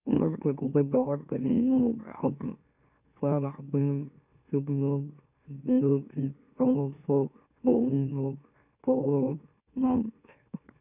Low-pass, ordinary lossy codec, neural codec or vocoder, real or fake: 3.6 kHz; none; autoencoder, 44.1 kHz, a latent of 192 numbers a frame, MeloTTS; fake